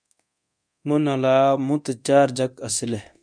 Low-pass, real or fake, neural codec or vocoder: 9.9 kHz; fake; codec, 24 kHz, 0.9 kbps, DualCodec